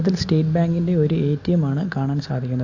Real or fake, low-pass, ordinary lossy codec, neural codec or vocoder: real; 7.2 kHz; AAC, 48 kbps; none